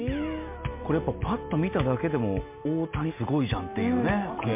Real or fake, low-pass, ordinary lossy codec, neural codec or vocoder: real; 3.6 kHz; MP3, 32 kbps; none